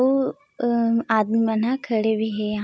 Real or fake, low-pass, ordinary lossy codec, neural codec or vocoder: real; none; none; none